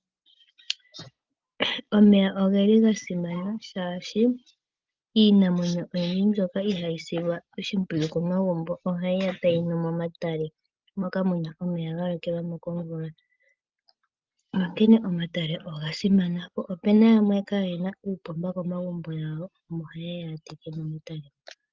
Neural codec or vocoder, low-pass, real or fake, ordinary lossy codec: none; 7.2 kHz; real; Opus, 32 kbps